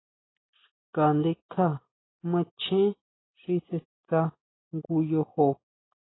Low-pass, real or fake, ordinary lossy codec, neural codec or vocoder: 7.2 kHz; real; AAC, 16 kbps; none